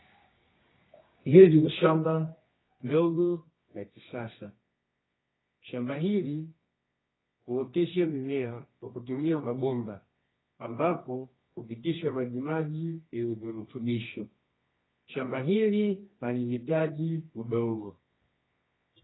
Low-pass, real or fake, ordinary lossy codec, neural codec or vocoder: 7.2 kHz; fake; AAC, 16 kbps; codec, 24 kHz, 0.9 kbps, WavTokenizer, medium music audio release